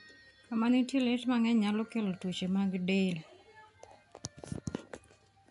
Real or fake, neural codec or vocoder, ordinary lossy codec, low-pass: real; none; AAC, 96 kbps; 10.8 kHz